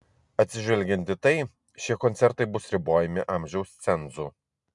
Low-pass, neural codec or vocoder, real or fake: 10.8 kHz; none; real